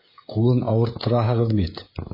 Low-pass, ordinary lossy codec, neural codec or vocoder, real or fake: 5.4 kHz; MP3, 24 kbps; codec, 16 kHz, 16 kbps, FreqCodec, smaller model; fake